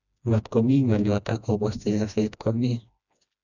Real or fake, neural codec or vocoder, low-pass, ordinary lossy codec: fake; codec, 16 kHz, 1 kbps, FreqCodec, smaller model; 7.2 kHz; none